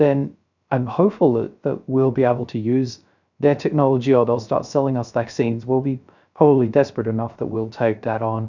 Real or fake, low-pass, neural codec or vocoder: fake; 7.2 kHz; codec, 16 kHz, 0.3 kbps, FocalCodec